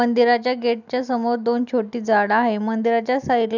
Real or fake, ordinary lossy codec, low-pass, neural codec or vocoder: real; none; 7.2 kHz; none